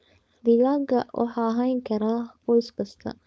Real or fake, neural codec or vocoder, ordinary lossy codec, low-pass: fake; codec, 16 kHz, 4.8 kbps, FACodec; none; none